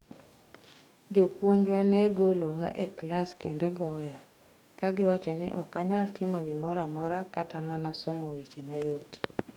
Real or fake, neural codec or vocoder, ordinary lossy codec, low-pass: fake; codec, 44.1 kHz, 2.6 kbps, DAC; none; 19.8 kHz